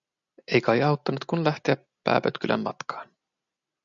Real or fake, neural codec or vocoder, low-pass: real; none; 7.2 kHz